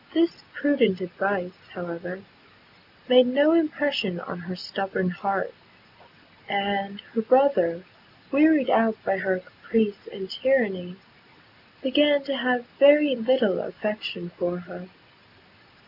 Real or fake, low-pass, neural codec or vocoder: real; 5.4 kHz; none